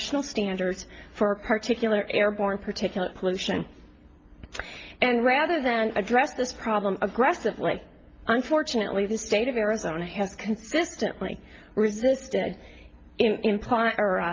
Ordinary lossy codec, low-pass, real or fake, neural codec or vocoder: Opus, 24 kbps; 7.2 kHz; real; none